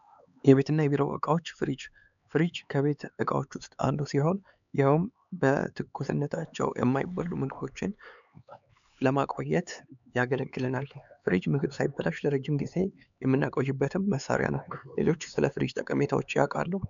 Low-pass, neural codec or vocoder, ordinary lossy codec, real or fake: 7.2 kHz; codec, 16 kHz, 4 kbps, X-Codec, HuBERT features, trained on LibriSpeech; MP3, 96 kbps; fake